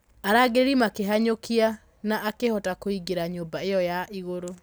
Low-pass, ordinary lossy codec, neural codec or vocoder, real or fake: none; none; none; real